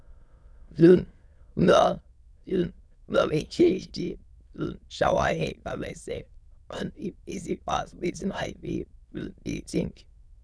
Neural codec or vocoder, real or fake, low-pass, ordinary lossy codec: autoencoder, 22.05 kHz, a latent of 192 numbers a frame, VITS, trained on many speakers; fake; none; none